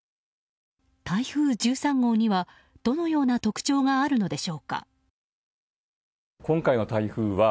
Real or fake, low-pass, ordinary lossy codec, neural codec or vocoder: real; none; none; none